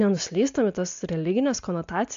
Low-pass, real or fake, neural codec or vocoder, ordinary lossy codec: 7.2 kHz; real; none; AAC, 64 kbps